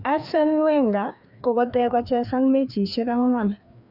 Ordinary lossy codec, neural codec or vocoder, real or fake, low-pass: none; codec, 16 kHz, 2 kbps, FreqCodec, larger model; fake; 5.4 kHz